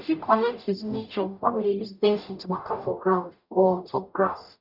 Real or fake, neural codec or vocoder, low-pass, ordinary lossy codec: fake; codec, 44.1 kHz, 0.9 kbps, DAC; 5.4 kHz; none